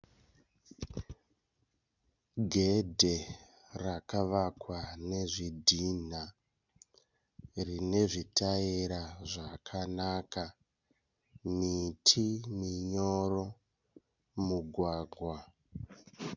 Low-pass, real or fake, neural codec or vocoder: 7.2 kHz; real; none